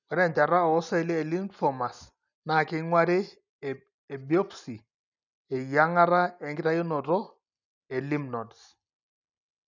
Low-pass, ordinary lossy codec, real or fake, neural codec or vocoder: 7.2 kHz; none; real; none